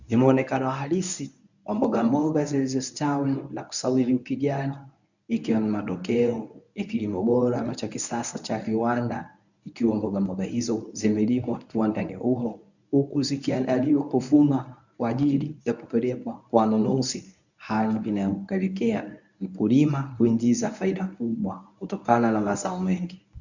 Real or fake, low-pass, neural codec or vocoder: fake; 7.2 kHz; codec, 24 kHz, 0.9 kbps, WavTokenizer, medium speech release version 1